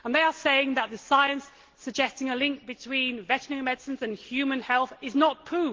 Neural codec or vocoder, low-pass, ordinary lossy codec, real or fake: none; 7.2 kHz; Opus, 16 kbps; real